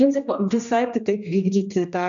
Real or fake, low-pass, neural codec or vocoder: fake; 7.2 kHz; codec, 16 kHz, 1 kbps, X-Codec, HuBERT features, trained on general audio